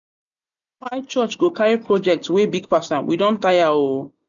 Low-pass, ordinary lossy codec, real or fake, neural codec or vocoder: 7.2 kHz; none; real; none